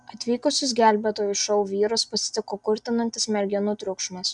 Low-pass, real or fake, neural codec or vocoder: 10.8 kHz; real; none